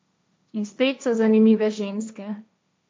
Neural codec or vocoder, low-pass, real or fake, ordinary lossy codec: codec, 16 kHz, 1.1 kbps, Voila-Tokenizer; none; fake; none